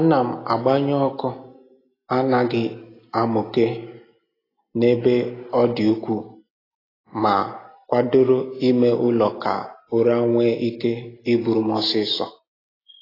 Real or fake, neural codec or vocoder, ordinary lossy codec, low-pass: real; none; AAC, 24 kbps; 5.4 kHz